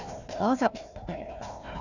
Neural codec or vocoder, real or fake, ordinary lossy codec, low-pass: codec, 16 kHz, 1 kbps, FunCodec, trained on Chinese and English, 50 frames a second; fake; none; 7.2 kHz